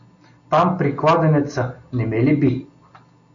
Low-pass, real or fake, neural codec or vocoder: 7.2 kHz; real; none